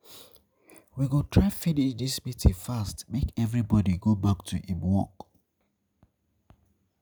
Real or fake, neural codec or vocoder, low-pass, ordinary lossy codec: real; none; none; none